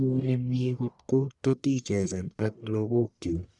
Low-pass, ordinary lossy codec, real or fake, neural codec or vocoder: 10.8 kHz; none; fake; codec, 44.1 kHz, 1.7 kbps, Pupu-Codec